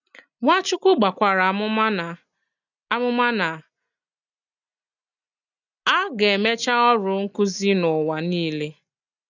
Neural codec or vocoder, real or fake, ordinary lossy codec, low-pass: none; real; none; 7.2 kHz